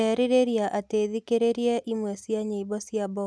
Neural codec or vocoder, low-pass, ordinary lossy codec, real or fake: none; none; none; real